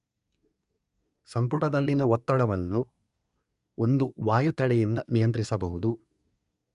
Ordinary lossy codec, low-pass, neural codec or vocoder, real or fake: none; 10.8 kHz; codec, 24 kHz, 1 kbps, SNAC; fake